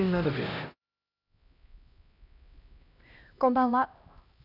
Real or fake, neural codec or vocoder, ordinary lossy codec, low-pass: fake; codec, 16 kHz, 1 kbps, X-Codec, HuBERT features, trained on LibriSpeech; none; 5.4 kHz